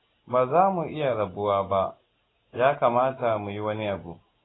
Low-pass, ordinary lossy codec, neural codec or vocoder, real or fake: 7.2 kHz; AAC, 16 kbps; none; real